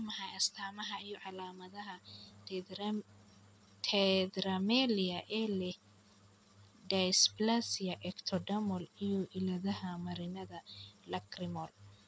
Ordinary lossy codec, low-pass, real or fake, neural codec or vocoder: none; none; real; none